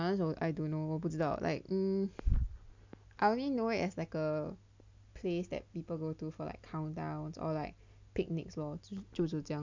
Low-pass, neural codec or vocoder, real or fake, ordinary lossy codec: 7.2 kHz; none; real; MP3, 64 kbps